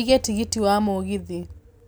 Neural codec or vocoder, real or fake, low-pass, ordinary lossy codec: none; real; none; none